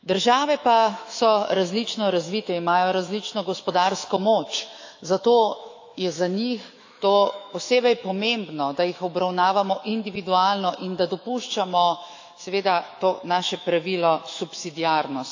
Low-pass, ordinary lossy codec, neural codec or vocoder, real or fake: 7.2 kHz; AAC, 48 kbps; autoencoder, 48 kHz, 128 numbers a frame, DAC-VAE, trained on Japanese speech; fake